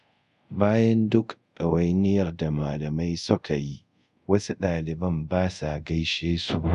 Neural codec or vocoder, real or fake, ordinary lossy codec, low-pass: codec, 24 kHz, 0.5 kbps, DualCodec; fake; none; 10.8 kHz